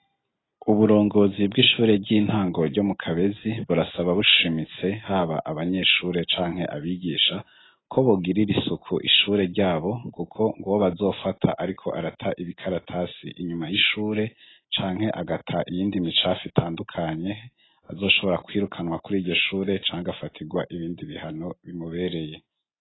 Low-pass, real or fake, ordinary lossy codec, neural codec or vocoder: 7.2 kHz; real; AAC, 16 kbps; none